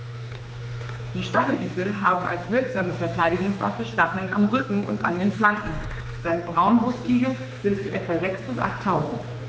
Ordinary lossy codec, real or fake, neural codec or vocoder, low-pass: none; fake; codec, 16 kHz, 2 kbps, X-Codec, HuBERT features, trained on general audio; none